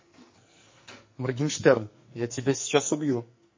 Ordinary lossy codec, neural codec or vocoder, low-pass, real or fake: MP3, 32 kbps; codec, 44.1 kHz, 2.6 kbps, SNAC; 7.2 kHz; fake